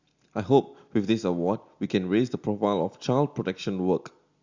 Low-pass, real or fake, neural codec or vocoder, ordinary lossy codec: 7.2 kHz; real; none; Opus, 64 kbps